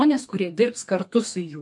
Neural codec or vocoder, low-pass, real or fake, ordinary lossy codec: codec, 32 kHz, 1.9 kbps, SNAC; 10.8 kHz; fake; MP3, 64 kbps